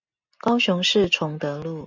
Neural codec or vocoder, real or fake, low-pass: none; real; 7.2 kHz